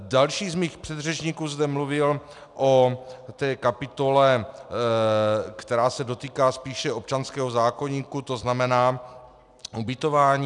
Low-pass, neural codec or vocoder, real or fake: 10.8 kHz; none; real